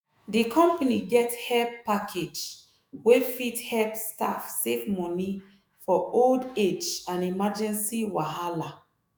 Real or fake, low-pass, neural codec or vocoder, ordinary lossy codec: fake; none; autoencoder, 48 kHz, 128 numbers a frame, DAC-VAE, trained on Japanese speech; none